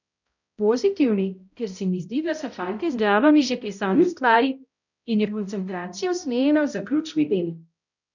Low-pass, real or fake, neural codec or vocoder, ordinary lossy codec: 7.2 kHz; fake; codec, 16 kHz, 0.5 kbps, X-Codec, HuBERT features, trained on balanced general audio; none